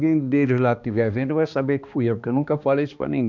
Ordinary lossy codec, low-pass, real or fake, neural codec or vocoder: none; 7.2 kHz; fake; codec, 16 kHz, 2 kbps, X-Codec, HuBERT features, trained on balanced general audio